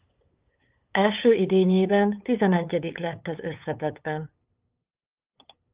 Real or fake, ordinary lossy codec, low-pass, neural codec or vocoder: fake; Opus, 24 kbps; 3.6 kHz; codec, 16 kHz, 8 kbps, FunCodec, trained on LibriTTS, 25 frames a second